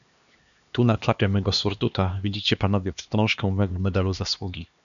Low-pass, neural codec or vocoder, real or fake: 7.2 kHz; codec, 16 kHz, 2 kbps, X-Codec, HuBERT features, trained on LibriSpeech; fake